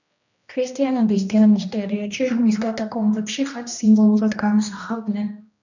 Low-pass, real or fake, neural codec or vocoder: 7.2 kHz; fake; codec, 16 kHz, 1 kbps, X-Codec, HuBERT features, trained on general audio